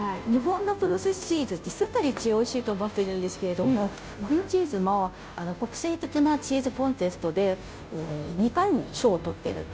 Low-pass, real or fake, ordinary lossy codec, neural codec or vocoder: none; fake; none; codec, 16 kHz, 0.5 kbps, FunCodec, trained on Chinese and English, 25 frames a second